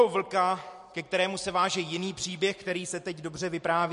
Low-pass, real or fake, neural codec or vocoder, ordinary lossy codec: 14.4 kHz; real; none; MP3, 48 kbps